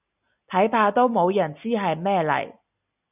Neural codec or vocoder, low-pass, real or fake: none; 3.6 kHz; real